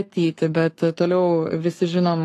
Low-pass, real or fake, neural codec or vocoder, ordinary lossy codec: 14.4 kHz; fake; codec, 44.1 kHz, 7.8 kbps, Pupu-Codec; AAC, 48 kbps